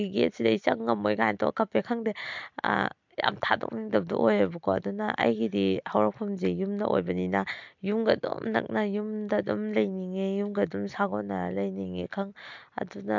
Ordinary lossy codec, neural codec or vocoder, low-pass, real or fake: MP3, 64 kbps; none; 7.2 kHz; real